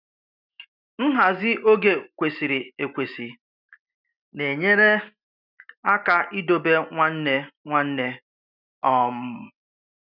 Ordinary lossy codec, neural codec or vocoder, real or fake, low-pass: none; none; real; 5.4 kHz